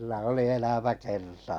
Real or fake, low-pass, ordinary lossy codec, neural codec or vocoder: real; 19.8 kHz; none; none